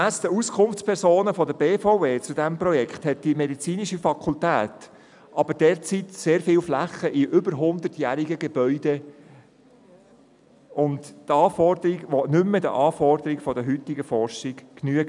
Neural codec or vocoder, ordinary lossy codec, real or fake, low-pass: none; none; real; 10.8 kHz